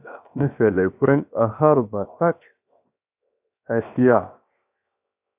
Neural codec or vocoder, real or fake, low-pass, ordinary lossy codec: codec, 16 kHz, 0.7 kbps, FocalCodec; fake; 3.6 kHz; AAC, 32 kbps